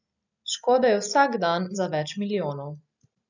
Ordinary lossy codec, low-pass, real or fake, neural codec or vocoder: none; 7.2 kHz; real; none